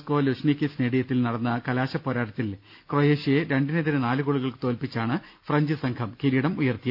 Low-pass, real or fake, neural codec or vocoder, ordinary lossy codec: 5.4 kHz; real; none; none